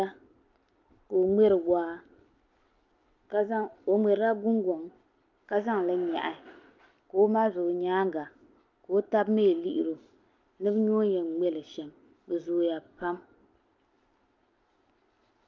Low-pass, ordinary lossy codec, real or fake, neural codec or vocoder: 7.2 kHz; Opus, 24 kbps; real; none